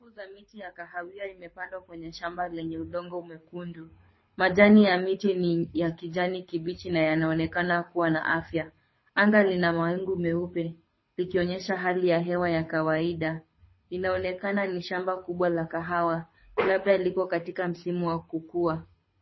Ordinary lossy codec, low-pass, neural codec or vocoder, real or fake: MP3, 24 kbps; 7.2 kHz; codec, 24 kHz, 6 kbps, HILCodec; fake